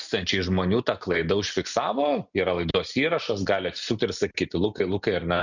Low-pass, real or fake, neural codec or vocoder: 7.2 kHz; real; none